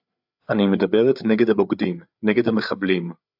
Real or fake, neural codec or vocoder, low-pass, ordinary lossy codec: fake; codec, 16 kHz, 8 kbps, FreqCodec, larger model; 5.4 kHz; AAC, 48 kbps